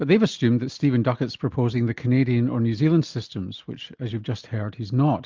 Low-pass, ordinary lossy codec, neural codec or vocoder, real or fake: 7.2 kHz; Opus, 24 kbps; none; real